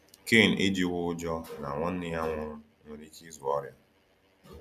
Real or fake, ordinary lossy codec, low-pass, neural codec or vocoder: real; none; 14.4 kHz; none